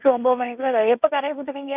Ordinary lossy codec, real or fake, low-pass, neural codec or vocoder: none; fake; 3.6 kHz; codec, 16 kHz, 1.1 kbps, Voila-Tokenizer